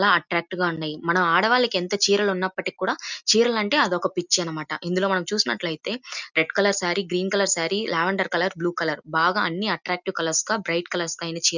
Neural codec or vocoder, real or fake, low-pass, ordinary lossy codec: none; real; 7.2 kHz; none